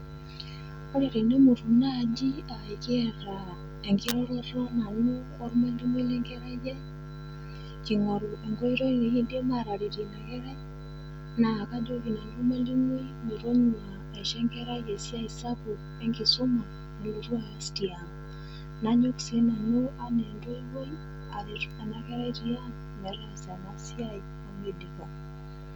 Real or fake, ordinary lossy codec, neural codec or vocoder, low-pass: real; none; none; 19.8 kHz